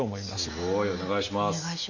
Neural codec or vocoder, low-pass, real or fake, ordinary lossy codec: none; 7.2 kHz; real; none